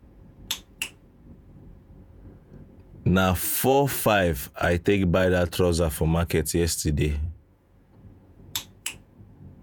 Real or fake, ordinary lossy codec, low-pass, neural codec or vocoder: fake; none; none; vocoder, 48 kHz, 128 mel bands, Vocos